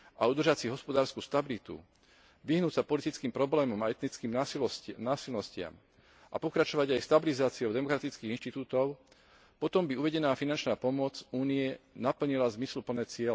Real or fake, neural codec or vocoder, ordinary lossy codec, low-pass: real; none; none; none